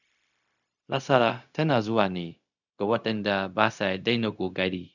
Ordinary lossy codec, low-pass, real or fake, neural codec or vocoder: none; 7.2 kHz; fake; codec, 16 kHz, 0.4 kbps, LongCat-Audio-Codec